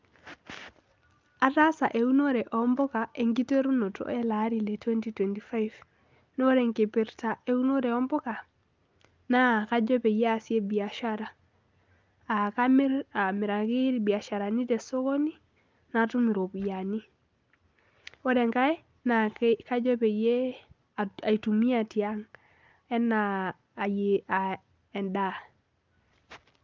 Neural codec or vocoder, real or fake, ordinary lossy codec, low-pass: none; real; Opus, 24 kbps; 7.2 kHz